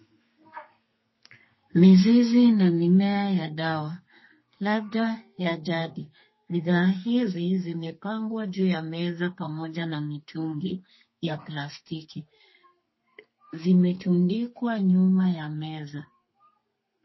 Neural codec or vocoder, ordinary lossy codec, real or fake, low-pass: codec, 32 kHz, 1.9 kbps, SNAC; MP3, 24 kbps; fake; 7.2 kHz